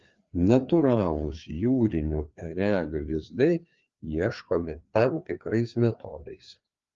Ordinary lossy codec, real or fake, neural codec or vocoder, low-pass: Opus, 32 kbps; fake; codec, 16 kHz, 2 kbps, FreqCodec, larger model; 7.2 kHz